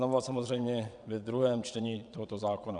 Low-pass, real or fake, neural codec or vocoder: 9.9 kHz; fake; vocoder, 22.05 kHz, 80 mel bands, WaveNeXt